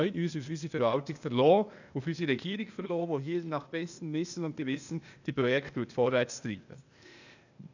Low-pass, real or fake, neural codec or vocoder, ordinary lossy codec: 7.2 kHz; fake; codec, 16 kHz, 0.8 kbps, ZipCodec; none